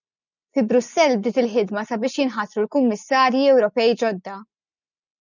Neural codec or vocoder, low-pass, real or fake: none; 7.2 kHz; real